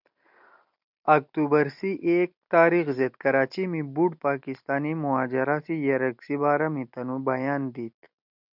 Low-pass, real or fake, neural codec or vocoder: 5.4 kHz; real; none